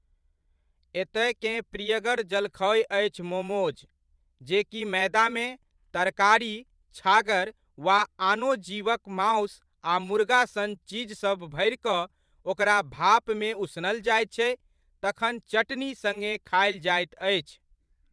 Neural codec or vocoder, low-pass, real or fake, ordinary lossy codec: vocoder, 22.05 kHz, 80 mel bands, Vocos; none; fake; none